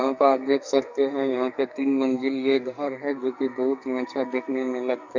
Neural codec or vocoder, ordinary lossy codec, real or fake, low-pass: codec, 44.1 kHz, 2.6 kbps, SNAC; none; fake; 7.2 kHz